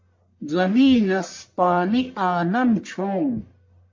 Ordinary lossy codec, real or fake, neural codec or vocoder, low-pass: MP3, 48 kbps; fake; codec, 44.1 kHz, 1.7 kbps, Pupu-Codec; 7.2 kHz